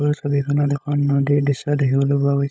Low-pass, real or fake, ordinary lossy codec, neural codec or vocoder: none; fake; none; codec, 16 kHz, 16 kbps, FunCodec, trained on LibriTTS, 50 frames a second